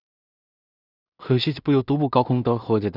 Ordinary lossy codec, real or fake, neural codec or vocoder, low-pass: none; fake; codec, 16 kHz in and 24 kHz out, 0.4 kbps, LongCat-Audio-Codec, two codebook decoder; 5.4 kHz